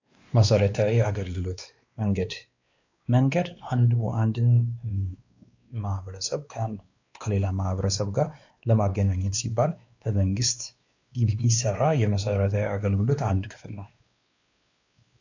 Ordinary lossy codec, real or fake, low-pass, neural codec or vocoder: AAC, 48 kbps; fake; 7.2 kHz; codec, 16 kHz, 2 kbps, X-Codec, WavLM features, trained on Multilingual LibriSpeech